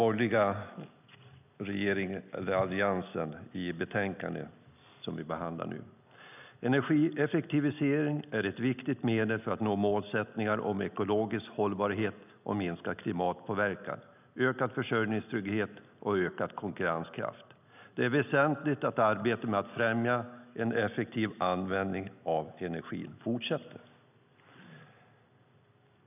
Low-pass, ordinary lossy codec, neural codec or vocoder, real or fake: 3.6 kHz; none; none; real